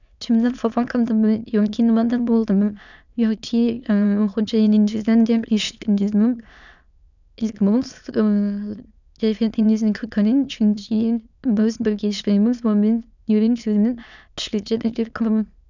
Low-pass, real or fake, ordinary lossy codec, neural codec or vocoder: 7.2 kHz; fake; none; autoencoder, 22.05 kHz, a latent of 192 numbers a frame, VITS, trained on many speakers